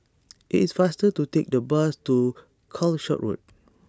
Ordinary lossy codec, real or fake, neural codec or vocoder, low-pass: none; real; none; none